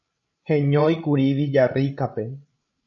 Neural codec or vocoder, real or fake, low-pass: codec, 16 kHz, 16 kbps, FreqCodec, larger model; fake; 7.2 kHz